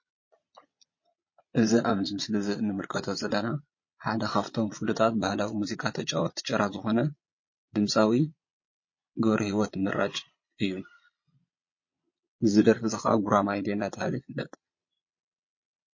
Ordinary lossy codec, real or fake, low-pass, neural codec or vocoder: MP3, 32 kbps; fake; 7.2 kHz; vocoder, 22.05 kHz, 80 mel bands, Vocos